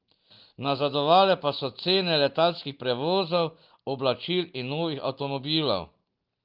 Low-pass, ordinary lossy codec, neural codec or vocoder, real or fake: 5.4 kHz; Opus, 32 kbps; none; real